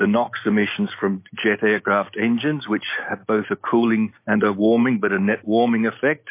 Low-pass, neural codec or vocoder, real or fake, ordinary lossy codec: 3.6 kHz; none; real; MP3, 24 kbps